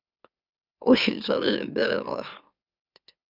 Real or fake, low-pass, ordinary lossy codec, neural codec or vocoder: fake; 5.4 kHz; Opus, 64 kbps; autoencoder, 44.1 kHz, a latent of 192 numbers a frame, MeloTTS